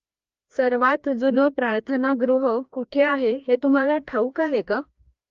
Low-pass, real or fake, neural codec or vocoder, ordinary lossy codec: 7.2 kHz; fake; codec, 16 kHz, 1 kbps, FreqCodec, larger model; Opus, 32 kbps